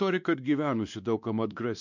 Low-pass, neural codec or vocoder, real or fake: 7.2 kHz; codec, 16 kHz, 2 kbps, X-Codec, WavLM features, trained on Multilingual LibriSpeech; fake